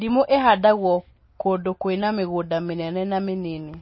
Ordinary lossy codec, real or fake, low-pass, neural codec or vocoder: MP3, 24 kbps; real; 7.2 kHz; none